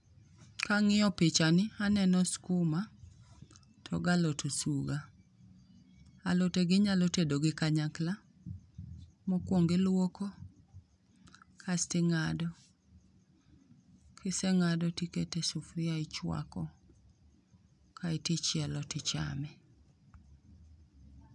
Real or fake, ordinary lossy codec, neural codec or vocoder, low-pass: real; none; none; 10.8 kHz